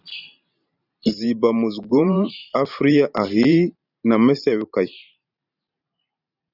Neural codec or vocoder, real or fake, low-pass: vocoder, 44.1 kHz, 128 mel bands every 512 samples, BigVGAN v2; fake; 5.4 kHz